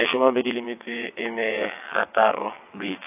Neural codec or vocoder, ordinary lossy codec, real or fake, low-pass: vocoder, 22.05 kHz, 80 mel bands, WaveNeXt; AAC, 32 kbps; fake; 3.6 kHz